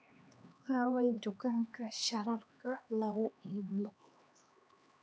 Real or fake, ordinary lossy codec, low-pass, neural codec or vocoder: fake; none; none; codec, 16 kHz, 2 kbps, X-Codec, HuBERT features, trained on LibriSpeech